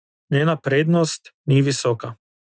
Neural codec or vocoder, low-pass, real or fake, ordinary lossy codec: none; none; real; none